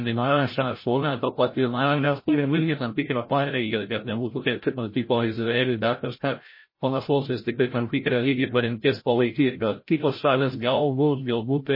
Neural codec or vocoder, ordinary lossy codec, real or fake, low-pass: codec, 16 kHz, 0.5 kbps, FreqCodec, larger model; MP3, 24 kbps; fake; 5.4 kHz